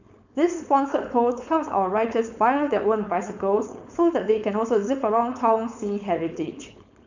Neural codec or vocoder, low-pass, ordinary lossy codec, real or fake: codec, 16 kHz, 4.8 kbps, FACodec; 7.2 kHz; none; fake